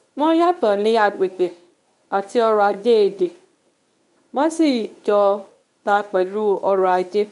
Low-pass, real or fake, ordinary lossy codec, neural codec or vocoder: 10.8 kHz; fake; none; codec, 24 kHz, 0.9 kbps, WavTokenizer, medium speech release version 1